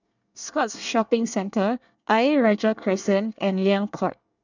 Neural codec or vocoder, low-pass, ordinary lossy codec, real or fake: codec, 24 kHz, 1 kbps, SNAC; 7.2 kHz; none; fake